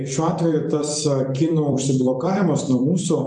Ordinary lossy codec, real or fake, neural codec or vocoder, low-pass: MP3, 96 kbps; fake; vocoder, 44.1 kHz, 128 mel bands every 256 samples, BigVGAN v2; 10.8 kHz